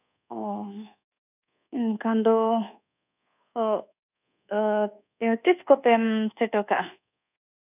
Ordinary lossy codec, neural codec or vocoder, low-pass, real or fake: none; codec, 24 kHz, 1.2 kbps, DualCodec; 3.6 kHz; fake